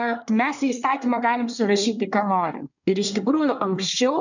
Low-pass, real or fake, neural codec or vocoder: 7.2 kHz; fake; codec, 24 kHz, 1 kbps, SNAC